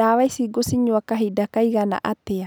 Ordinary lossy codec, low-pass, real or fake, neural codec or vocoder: none; none; real; none